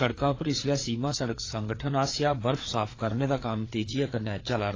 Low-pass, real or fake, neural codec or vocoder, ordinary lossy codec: 7.2 kHz; fake; codec, 16 kHz, 4 kbps, FreqCodec, larger model; AAC, 32 kbps